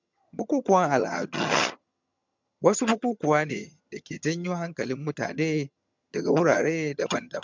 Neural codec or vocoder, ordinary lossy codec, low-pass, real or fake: vocoder, 22.05 kHz, 80 mel bands, HiFi-GAN; MP3, 64 kbps; 7.2 kHz; fake